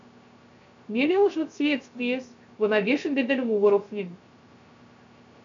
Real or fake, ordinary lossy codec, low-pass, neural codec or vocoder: fake; MP3, 64 kbps; 7.2 kHz; codec, 16 kHz, 0.3 kbps, FocalCodec